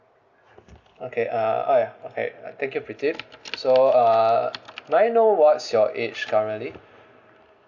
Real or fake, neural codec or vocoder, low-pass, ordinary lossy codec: real; none; 7.2 kHz; Opus, 64 kbps